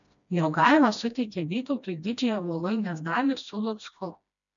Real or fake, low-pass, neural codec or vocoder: fake; 7.2 kHz; codec, 16 kHz, 1 kbps, FreqCodec, smaller model